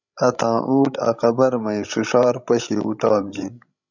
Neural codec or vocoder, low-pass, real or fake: codec, 16 kHz, 16 kbps, FreqCodec, larger model; 7.2 kHz; fake